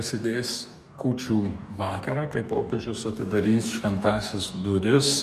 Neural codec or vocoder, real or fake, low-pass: codec, 44.1 kHz, 2.6 kbps, DAC; fake; 14.4 kHz